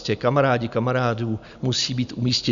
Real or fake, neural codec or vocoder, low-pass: real; none; 7.2 kHz